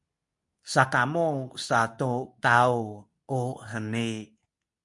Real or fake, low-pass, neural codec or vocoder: fake; 10.8 kHz; codec, 24 kHz, 0.9 kbps, WavTokenizer, medium speech release version 2